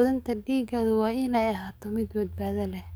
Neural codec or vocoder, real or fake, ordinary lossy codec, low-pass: codec, 44.1 kHz, 7.8 kbps, DAC; fake; none; none